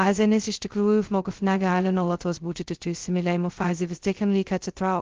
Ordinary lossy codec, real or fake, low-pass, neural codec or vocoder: Opus, 16 kbps; fake; 7.2 kHz; codec, 16 kHz, 0.2 kbps, FocalCodec